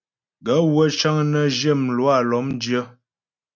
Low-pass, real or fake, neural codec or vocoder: 7.2 kHz; real; none